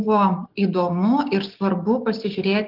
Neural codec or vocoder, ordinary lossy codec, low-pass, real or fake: none; Opus, 32 kbps; 5.4 kHz; real